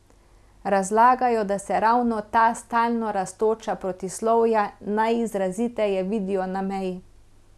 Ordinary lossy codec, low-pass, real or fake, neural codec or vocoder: none; none; real; none